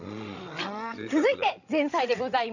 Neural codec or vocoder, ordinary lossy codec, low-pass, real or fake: codec, 16 kHz, 16 kbps, FreqCodec, larger model; none; 7.2 kHz; fake